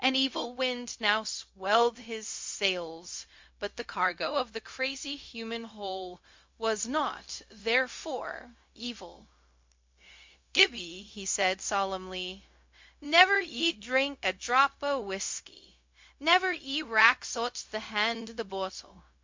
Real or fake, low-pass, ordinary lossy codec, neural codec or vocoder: fake; 7.2 kHz; MP3, 48 kbps; codec, 16 kHz, 0.4 kbps, LongCat-Audio-Codec